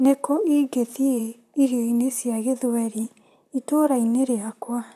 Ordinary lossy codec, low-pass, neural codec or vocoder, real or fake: none; 14.4 kHz; vocoder, 44.1 kHz, 128 mel bands, Pupu-Vocoder; fake